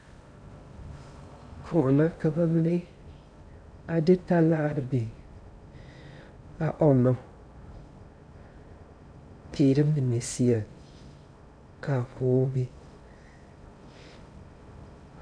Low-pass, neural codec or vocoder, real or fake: 9.9 kHz; codec, 16 kHz in and 24 kHz out, 0.6 kbps, FocalCodec, streaming, 2048 codes; fake